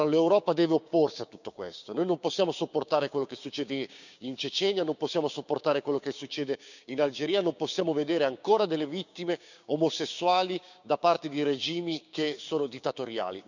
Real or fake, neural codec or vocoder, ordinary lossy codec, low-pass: fake; codec, 16 kHz, 6 kbps, DAC; none; 7.2 kHz